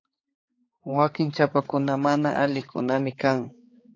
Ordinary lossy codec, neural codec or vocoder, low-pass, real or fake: AAC, 32 kbps; codec, 16 kHz, 4 kbps, X-Codec, HuBERT features, trained on balanced general audio; 7.2 kHz; fake